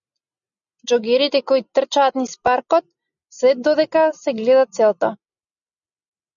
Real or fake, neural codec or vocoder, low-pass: real; none; 7.2 kHz